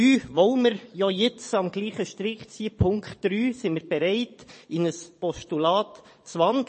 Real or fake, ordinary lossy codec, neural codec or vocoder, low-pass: fake; MP3, 32 kbps; vocoder, 22.05 kHz, 80 mel bands, Vocos; 9.9 kHz